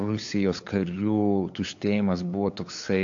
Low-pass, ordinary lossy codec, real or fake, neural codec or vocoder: 7.2 kHz; AAC, 48 kbps; fake; codec, 16 kHz, 8 kbps, FunCodec, trained on LibriTTS, 25 frames a second